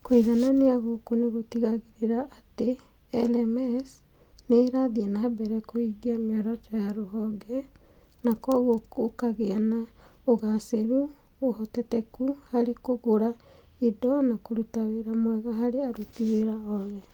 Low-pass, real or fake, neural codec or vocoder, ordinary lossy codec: 19.8 kHz; real; none; Opus, 32 kbps